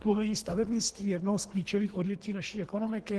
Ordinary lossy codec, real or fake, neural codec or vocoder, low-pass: Opus, 16 kbps; fake; codec, 24 kHz, 3 kbps, HILCodec; 10.8 kHz